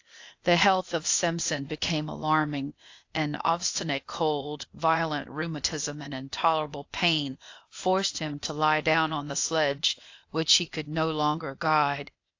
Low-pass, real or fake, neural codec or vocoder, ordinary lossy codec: 7.2 kHz; fake; codec, 16 kHz, 0.8 kbps, ZipCodec; AAC, 48 kbps